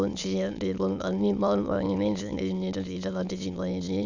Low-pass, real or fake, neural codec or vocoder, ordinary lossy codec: 7.2 kHz; fake; autoencoder, 22.05 kHz, a latent of 192 numbers a frame, VITS, trained on many speakers; none